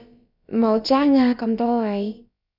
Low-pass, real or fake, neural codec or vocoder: 5.4 kHz; fake; codec, 16 kHz, about 1 kbps, DyCAST, with the encoder's durations